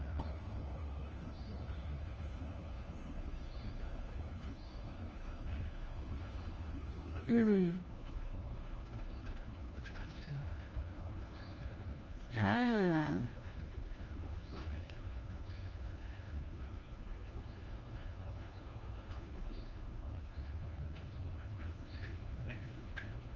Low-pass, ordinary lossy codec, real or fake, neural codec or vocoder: 7.2 kHz; Opus, 24 kbps; fake; codec, 16 kHz, 1 kbps, FunCodec, trained on LibriTTS, 50 frames a second